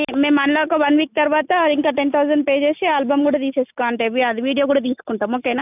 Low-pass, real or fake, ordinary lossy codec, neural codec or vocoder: 3.6 kHz; real; none; none